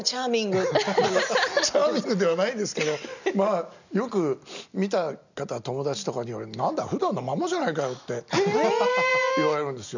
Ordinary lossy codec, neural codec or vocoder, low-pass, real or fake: none; none; 7.2 kHz; real